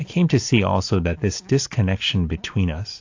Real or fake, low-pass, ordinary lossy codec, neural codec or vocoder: real; 7.2 kHz; AAC, 48 kbps; none